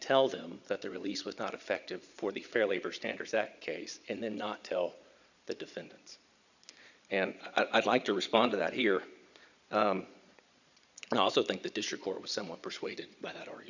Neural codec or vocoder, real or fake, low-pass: vocoder, 22.05 kHz, 80 mel bands, Vocos; fake; 7.2 kHz